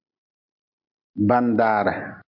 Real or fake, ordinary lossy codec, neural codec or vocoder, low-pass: real; MP3, 48 kbps; none; 5.4 kHz